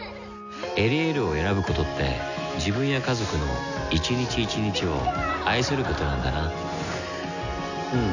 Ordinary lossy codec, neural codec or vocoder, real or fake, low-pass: none; none; real; 7.2 kHz